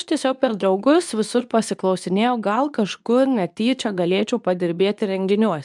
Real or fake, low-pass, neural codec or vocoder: fake; 10.8 kHz; codec, 24 kHz, 0.9 kbps, WavTokenizer, medium speech release version 2